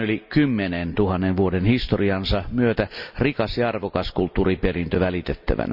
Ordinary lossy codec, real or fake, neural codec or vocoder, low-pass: none; real; none; 5.4 kHz